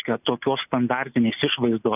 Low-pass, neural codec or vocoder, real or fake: 3.6 kHz; none; real